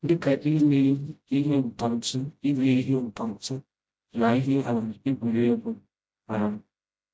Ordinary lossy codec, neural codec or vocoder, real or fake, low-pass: none; codec, 16 kHz, 0.5 kbps, FreqCodec, smaller model; fake; none